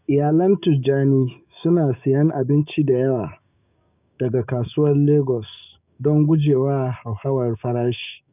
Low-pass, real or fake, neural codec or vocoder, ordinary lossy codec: 3.6 kHz; fake; codec, 16 kHz, 6 kbps, DAC; none